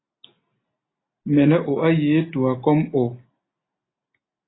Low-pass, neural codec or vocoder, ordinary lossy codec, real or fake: 7.2 kHz; none; AAC, 16 kbps; real